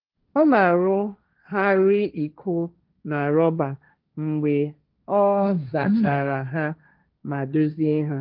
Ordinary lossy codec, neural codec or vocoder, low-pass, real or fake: Opus, 24 kbps; codec, 16 kHz, 1.1 kbps, Voila-Tokenizer; 5.4 kHz; fake